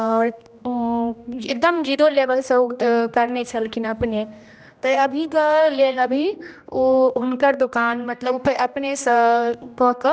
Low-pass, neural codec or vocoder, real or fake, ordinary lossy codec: none; codec, 16 kHz, 1 kbps, X-Codec, HuBERT features, trained on general audio; fake; none